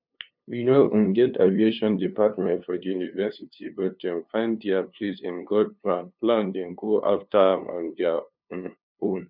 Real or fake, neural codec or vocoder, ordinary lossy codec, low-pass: fake; codec, 16 kHz, 2 kbps, FunCodec, trained on LibriTTS, 25 frames a second; none; 5.4 kHz